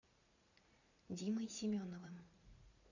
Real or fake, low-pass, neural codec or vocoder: fake; 7.2 kHz; vocoder, 44.1 kHz, 80 mel bands, Vocos